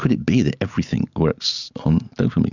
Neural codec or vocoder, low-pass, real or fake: none; 7.2 kHz; real